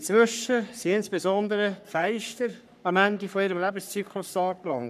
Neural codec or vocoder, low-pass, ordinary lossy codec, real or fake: codec, 44.1 kHz, 3.4 kbps, Pupu-Codec; 14.4 kHz; none; fake